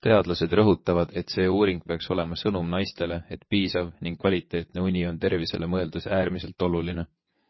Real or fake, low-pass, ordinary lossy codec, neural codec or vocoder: fake; 7.2 kHz; MP3, 24 kbps; vocoder, 22.05 kHz, 80 mel bands, WaveNeXt